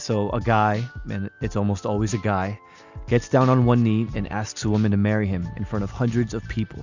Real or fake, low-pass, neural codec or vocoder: real; 7.2 kHz; none